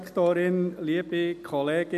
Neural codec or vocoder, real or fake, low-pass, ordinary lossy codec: none; real; 14.4 kHz; none